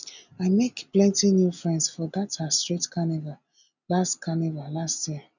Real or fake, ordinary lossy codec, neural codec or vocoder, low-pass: real; none; none; 7.2 kHz